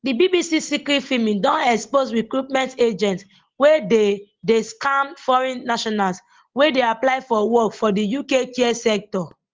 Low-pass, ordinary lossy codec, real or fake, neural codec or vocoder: 7.2 kHz; Opus, 16 kbps; real; none